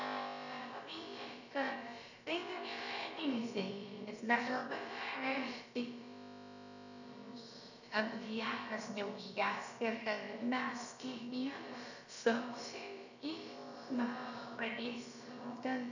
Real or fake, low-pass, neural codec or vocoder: fake; 7.2 kHz; codec, 16 kHz, about 1 kbps, DyCAST, with the encoder's durations